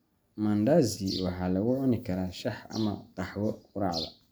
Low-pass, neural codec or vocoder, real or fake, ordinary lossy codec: none; none; real; none